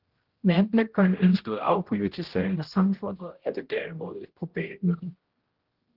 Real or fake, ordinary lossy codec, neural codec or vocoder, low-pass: fake; Opus, 16 kbps; codec, 16 kHz, 0.5 kbps, X-Codec, HuBERT features, trained on general audio; 5.4 kHz